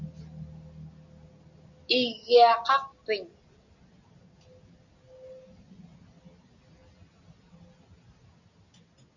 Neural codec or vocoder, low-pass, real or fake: none; 7.2 kHz; real